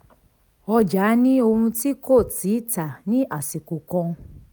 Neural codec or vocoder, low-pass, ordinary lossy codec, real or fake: none; none; none; real